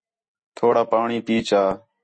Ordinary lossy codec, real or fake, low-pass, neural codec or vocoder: MP3, 32 kbps; real; 9.9 kHz; none